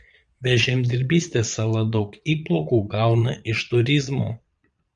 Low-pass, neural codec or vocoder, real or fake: 9.9 kHz; vocoder, 22.05 kHz, 80 mel bands, Vocos; fake